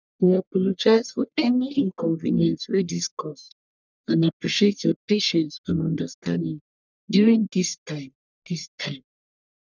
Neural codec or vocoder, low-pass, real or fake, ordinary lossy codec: codec, 44.1 kHz, 1.7 kbps, Pupu-Codec; 7.2 kHz; fake; none